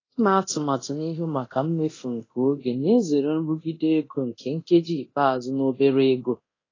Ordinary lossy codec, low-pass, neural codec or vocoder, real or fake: AAC, 32 kbps; 7.2 kHz; codec, 24 kHz, 0.9 kbps, DualCodec; fake